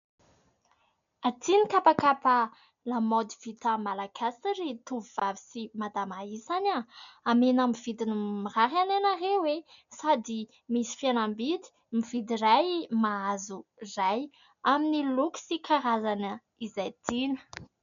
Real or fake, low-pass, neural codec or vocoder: real; 7.2 kHz; none